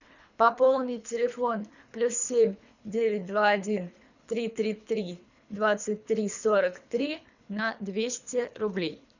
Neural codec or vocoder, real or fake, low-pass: codec, 24 kHz, 3 kbps, HILCodec; fake; 7.2 kHz